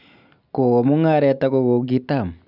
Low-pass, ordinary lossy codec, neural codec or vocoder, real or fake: 5.4 kHz; none; none; real